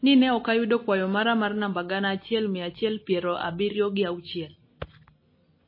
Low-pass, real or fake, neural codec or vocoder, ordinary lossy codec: 5.4 kHz; real; none; MP3, 24 kbps